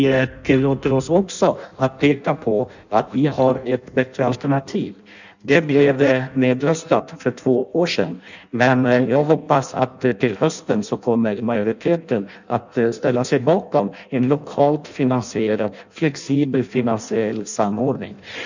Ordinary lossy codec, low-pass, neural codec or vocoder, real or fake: none; 7.2 kHz; codec, 16 kHz in and 24 kHz out, 0.6 kbps, FireRedTTS-2 codec; fake